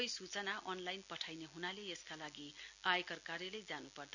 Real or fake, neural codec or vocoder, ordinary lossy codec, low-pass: real; none; none; 7.2 kHz